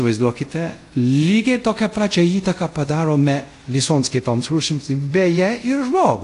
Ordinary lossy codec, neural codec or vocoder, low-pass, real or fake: AAC, 48 kbps; codec, 24 kHz, 0.5 kbps, DualCodec; 10.8 kHz; fake